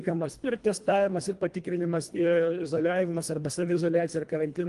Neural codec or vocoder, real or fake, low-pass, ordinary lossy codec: codec, 24 kHz, 1.5 kbps, HILCodec; fake; 10.8 kHz; Opus, 24 kbps